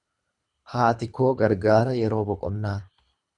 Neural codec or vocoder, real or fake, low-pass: codec, 24 kHz, 3 kbps, HILCodec; fake; 10.8 kHz